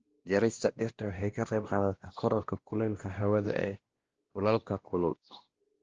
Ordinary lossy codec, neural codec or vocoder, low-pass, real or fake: Opus, 16 kbps; codec, 16 kHz, 1 kbps, X-Codec, WavLM features, trained on Multilingual LibriSpeech; 7.2 kHz; fake